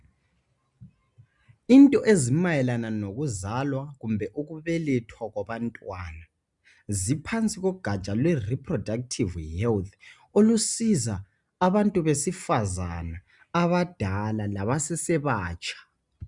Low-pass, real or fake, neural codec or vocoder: 10.8 kHz; real; none